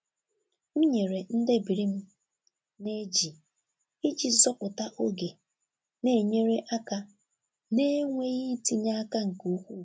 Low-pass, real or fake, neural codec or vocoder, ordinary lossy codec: none; real; none; none